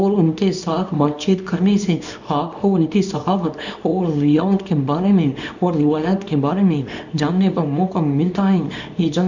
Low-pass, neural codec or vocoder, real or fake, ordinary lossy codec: 7.2 kHz; codec, 24 kHz, 0.9 kbps, WavTokenizer, small release; fake; none